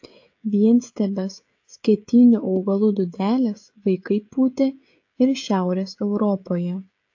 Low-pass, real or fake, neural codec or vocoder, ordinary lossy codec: 7.2 kHz; fake; codec, 16 kHz, 16 kbps, FreqCodec, smaller model; AAC, 48 kbps